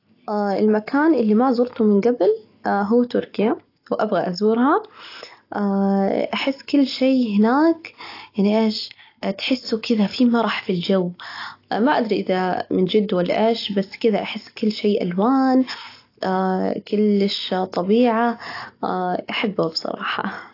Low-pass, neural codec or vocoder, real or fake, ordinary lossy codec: 5.4 kHz; none; real; AAC, 32 kbps